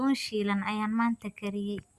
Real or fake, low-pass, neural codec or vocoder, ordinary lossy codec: real; 14.4 kHz; none; Opus, 64 kbps